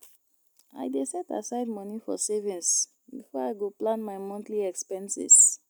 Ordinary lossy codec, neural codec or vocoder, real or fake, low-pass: none; none; real; none